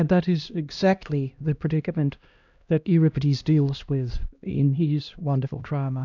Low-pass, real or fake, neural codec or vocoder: 7.2 kHz; fake; codec, 16 kHz, 1 kbps, X-Codec, HuBERT features, trained on LibriSpeech